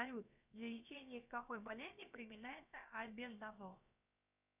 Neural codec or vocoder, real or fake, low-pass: codec, 16 kHz, about 1 kbps, DyCAST, with the encoder's durations; fake; 3.6 kHz